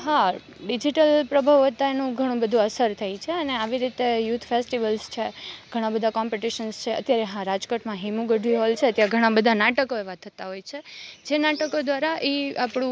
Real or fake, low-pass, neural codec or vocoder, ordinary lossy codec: real; none; none; none